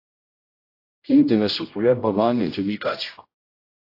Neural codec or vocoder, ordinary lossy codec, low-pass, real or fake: codec, 16 kHz, 0.5 kbps, X-Codec, HuBERT features, trained on general audio; AAC, 32 kbps; 5.4 kHz; fake